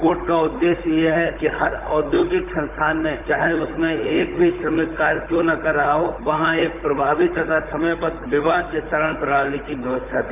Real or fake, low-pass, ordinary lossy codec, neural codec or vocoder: fake; 3.6 kHz; none; codec, 16 kHz, 16 kbps, FunCodec, trained on Chinese and English, 50 frames a second